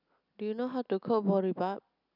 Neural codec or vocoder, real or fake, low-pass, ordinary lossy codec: none; real; 5.4 kHz; none